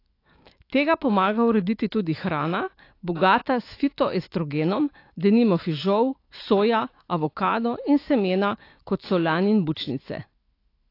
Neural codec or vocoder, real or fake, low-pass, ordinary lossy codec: autoencoder, 48 kHz, 128 numbers a frame, DAC-VAE, trained on Japanese speech; fake; 5.4 kHz; AAC, 32 kbps